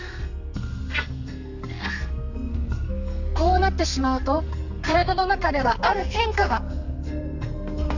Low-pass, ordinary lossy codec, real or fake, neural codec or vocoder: 7.2 kHz; none; fake; codec, 32 kHz, 1.9 kbps, SNAC